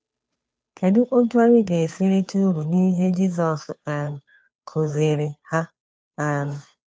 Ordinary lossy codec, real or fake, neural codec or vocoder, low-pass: none; fake; codec, 16 kHz, 2 kbps, FunCodec, trained on Chinese and English, 25 frames a second; none